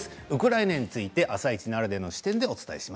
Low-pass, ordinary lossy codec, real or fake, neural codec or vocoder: none; none; real; none